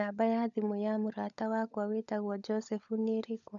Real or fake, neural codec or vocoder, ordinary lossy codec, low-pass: fake; codec, 16 kHz, 16 kbps, FunCodec, trained on LibriTTS, 50 frames a second; none; 7.2 kHz